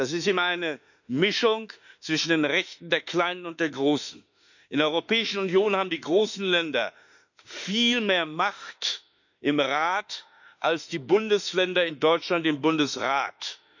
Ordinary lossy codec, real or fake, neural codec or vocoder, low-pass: none; fake; autoencoder, 48 kHz, 32 numbers a frame, DAC-VAE, trained on Japanese speech; 7.2 kHz